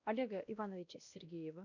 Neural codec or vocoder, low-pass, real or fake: codec, 16 kHz, 1 kbps, X-Codec, WavLM features, trained on Multilingual LibriSpeech; 7.2 kHz; fake